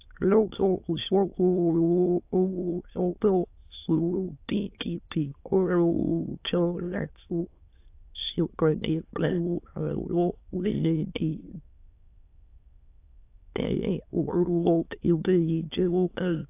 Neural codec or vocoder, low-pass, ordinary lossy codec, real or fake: autoencoder, 22.05 kHz, a latent of 192 numbers a frame, VITS, trained on many speakers; 3.6 kHz; AAC, 24 kbps; fake